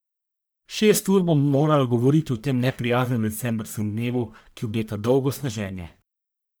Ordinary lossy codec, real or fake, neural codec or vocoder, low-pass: none; fake; codec, 44.1 kHz, 1.7 kbps, Pupu-Codec; none